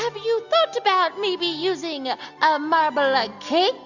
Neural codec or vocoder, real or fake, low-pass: none; real; 7.2 kHz